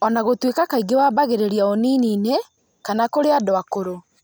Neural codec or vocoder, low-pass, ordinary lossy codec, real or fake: none; none; none; real